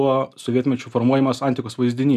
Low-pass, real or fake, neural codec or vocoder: 14.4 kHz; real; none